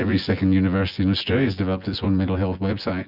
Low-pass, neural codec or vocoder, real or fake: 5.4 kHz; vocoder, 24 kHz, 100 mel bands, Vocos; fake